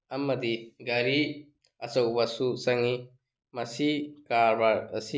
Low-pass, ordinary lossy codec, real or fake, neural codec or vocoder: none; none; real; none